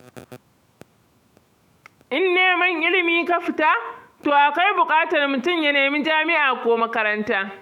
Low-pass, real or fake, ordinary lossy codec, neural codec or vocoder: 19.8 kHz; fake; none; autoencoder, 48 kHz, 128 numbers a frame, DAC-VAE, trained on Japanese speech